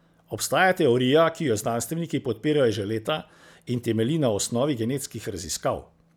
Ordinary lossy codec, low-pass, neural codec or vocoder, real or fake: none; none; none; real